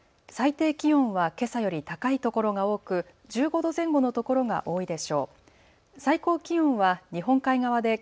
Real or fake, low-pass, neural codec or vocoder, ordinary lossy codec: real; none; none; none